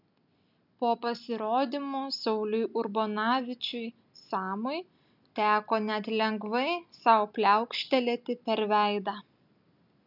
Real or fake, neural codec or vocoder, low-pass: real; none; 5.4 kHz